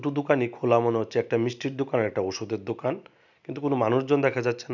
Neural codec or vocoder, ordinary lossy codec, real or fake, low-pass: none; none; real; 7.2 kHz